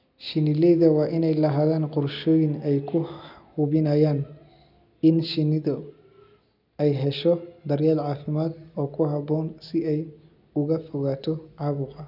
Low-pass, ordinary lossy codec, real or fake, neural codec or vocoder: 5.4 kHz; none; real; none